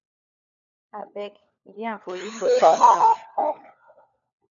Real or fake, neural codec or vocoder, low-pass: fake; codec, 16 kHz, 4 kbps, FunCodec, trained on LibriTTS, 50 frames a second; 7.2 kHz